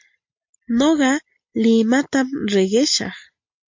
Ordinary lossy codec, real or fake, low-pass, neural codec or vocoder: MP3, 48 kbps; real; 7.2 kHz; none